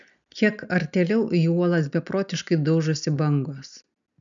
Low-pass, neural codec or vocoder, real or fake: 7.2 kHz; none; real